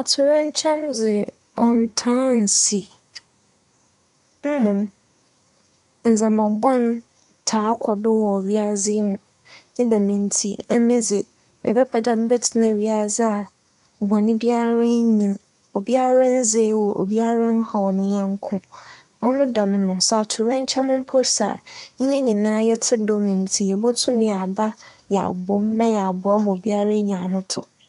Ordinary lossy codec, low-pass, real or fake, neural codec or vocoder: none; 10.8 kHz; fake; codec, 24 kHz, 1 kbps, SNAC